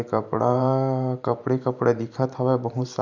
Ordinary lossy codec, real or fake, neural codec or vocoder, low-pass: none; fake; vocoder, 44.1 kHz, 128 mel bands every 512 samples, BigVGAN v2; 7.2 kHz